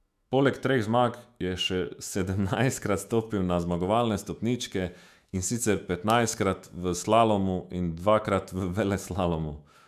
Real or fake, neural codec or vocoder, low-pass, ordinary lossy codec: fake; autoencoder, 48 kHz, 128 numbers a frame, DAC-VAE, trained on Japanese speech; 14.4 kHz; none